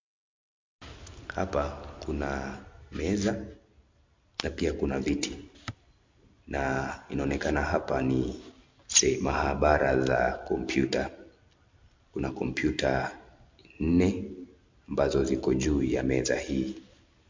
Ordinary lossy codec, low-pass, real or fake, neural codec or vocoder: MP3, 48 kbps; 7.2 kHz; real; none